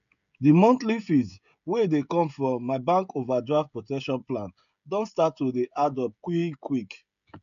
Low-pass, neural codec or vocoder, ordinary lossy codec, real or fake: 7.2 kHz; codec, 16 kHz, 16 kbps, FreqCodec, smaller model; MP3, 96 kbps; fake